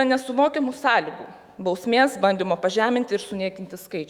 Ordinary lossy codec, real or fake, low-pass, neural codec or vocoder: Opus, 64 kbps; fake; 19.8 kHz; autoencoder, 48 kHz, 128 numbers a frame, DAC-VAE, trained on Japanese speech